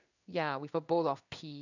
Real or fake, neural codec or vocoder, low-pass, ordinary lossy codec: fake; codec, 16 kHz in and 24 kHz out, 1 kbps, XY-Tokenizer; 7.2 kHz; none